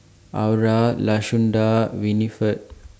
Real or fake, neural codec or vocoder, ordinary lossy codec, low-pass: real; none; none; none